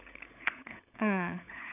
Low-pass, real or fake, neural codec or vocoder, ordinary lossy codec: 3.6 kHz; fake; codec, 16 kHz, 16 kbps, FunCodec, trained on LibriTTS, 50 frames a second; none